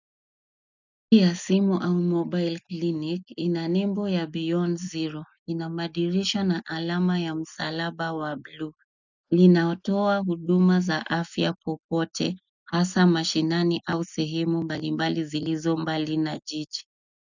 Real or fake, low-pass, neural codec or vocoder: real; 7.2 kHz; none